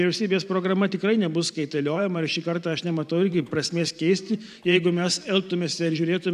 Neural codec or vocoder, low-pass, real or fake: vocoder, 44.1 kHz, 128 mel bands every 512 samples, BigVGAN v2; 14.4 kHz; fake